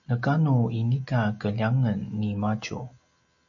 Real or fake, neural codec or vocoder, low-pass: real; none; 7.2 kHz